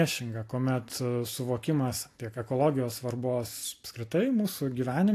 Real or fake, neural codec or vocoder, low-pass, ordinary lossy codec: real; none; 14.4 kHz; AAC, 64 kbps